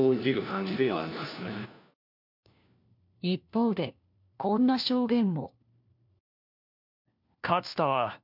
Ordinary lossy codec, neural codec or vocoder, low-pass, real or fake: MP3, 48 kbps; codec, 16 kHz, 1 kbps, FunCodec, trained on LibriTTS, 50 frames a second; 5.4 kHz; fake